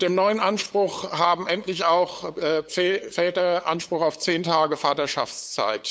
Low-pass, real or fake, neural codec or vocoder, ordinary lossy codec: none; fake; codec, 16 kHz, 8 kbps, FunCodec, trained on LibriTTS, 25 frames a second; none